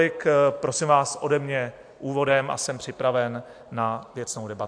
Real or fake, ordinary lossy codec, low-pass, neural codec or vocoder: real; AAC, 64 kbps; 9.9 kHz; none